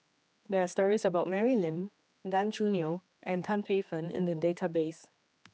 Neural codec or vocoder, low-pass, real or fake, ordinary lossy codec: codec, 16 kHz, 2 kbps, X-Codec, HuBERT features, trained on general audio; none; fake; none